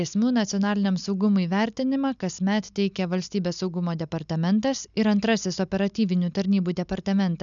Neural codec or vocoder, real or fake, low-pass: none; real; 7.2 kHz